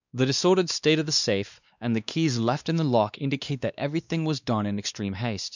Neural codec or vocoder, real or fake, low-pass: codec, 16 kHz, 2 kbps, X-Codec, WavLM features, trained on Multilingual LibriSpeech; fake; 7.2 kHz